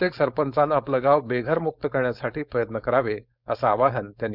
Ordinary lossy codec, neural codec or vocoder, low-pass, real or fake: none; codec, 16 kHz, 4.8 kbps, FACodec; 5.4 kHz; fake